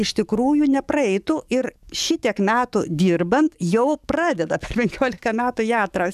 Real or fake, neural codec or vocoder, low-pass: fake; codec, 44.1 kHz, 7.8 kbps, DAC; 14.4 kHz